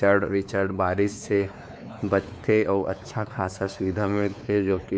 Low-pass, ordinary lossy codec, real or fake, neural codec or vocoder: none; none; fake; codec, 16 kHz, 4 kbps, X-Codec, WavLM features, trained on Multilingual LibriSpeech